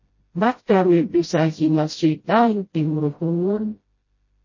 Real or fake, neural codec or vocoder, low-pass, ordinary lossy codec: fake; codec, 16 kHz, 0.5 kbps, FreqCodec, smaller model; 7.2 kHz; MP3, 32 kbps